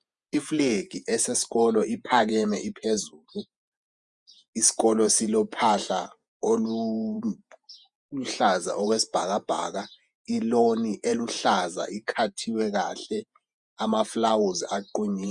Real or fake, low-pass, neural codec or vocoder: fake; 10.8 kHz; vocoder, 48 kHz, 128 mel bands, Vocos